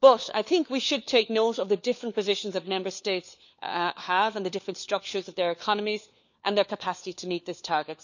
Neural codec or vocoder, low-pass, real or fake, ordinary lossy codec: codec, 16 kHz, 4 kbps, FunCodec, trained on LibriTTS, 50 frames a second; 7.2 kHz; fake; none